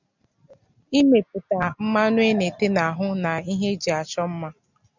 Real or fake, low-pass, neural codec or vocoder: real; 7.2 kHz; none